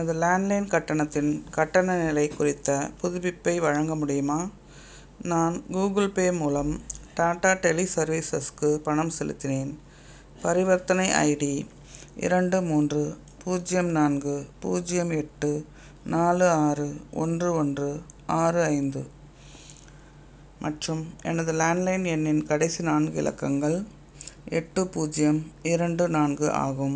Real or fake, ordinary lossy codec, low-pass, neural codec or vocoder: real; none; none; none